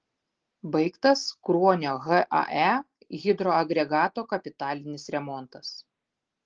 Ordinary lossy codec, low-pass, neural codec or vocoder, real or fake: Opus, 16 kbps; 7.2 kHz; none; real